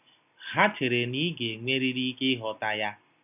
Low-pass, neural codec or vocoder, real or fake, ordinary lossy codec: 3.6 kHz; none; real; none